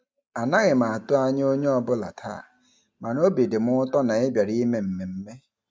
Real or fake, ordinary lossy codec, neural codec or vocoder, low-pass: real; none; none; none